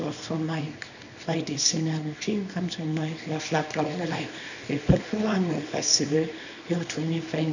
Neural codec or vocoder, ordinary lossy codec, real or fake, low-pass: codec, 24 kHz, 0.9 kbps, WavTokenizer, small release; none; fake; 7.2 kHz